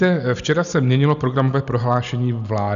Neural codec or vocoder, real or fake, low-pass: none; real; 7.2 kHz